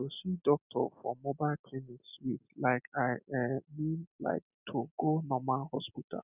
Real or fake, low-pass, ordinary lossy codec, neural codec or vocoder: real; 3.6 kHz; none; none